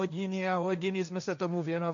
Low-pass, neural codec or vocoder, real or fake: 7.2 kHz; codec, 16 kHz, 1.1 kbps, Voila-Tokenizer; fake